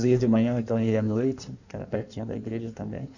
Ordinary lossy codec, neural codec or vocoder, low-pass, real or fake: none; codec, 16 kHz in and 24 kHz out, 1.1 kbps, FireRedTTS-2 codec; 7.2 kHz; fake